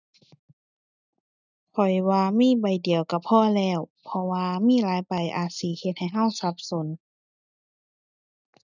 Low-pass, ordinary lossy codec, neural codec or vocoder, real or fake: 7.2 kHz; none; none; real